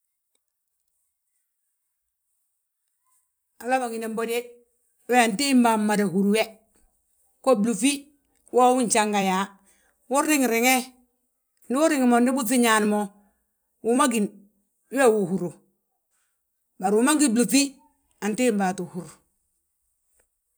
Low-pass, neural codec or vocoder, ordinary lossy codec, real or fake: none; vocoder, 44.1 kHz, 128 mel bands every 256 samples, BigVGAN v2; none; fake